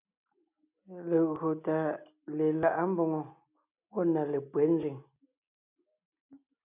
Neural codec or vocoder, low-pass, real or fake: none; 3.6 kHz; real